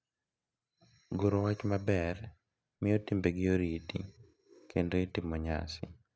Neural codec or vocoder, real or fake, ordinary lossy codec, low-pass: none; real; none; none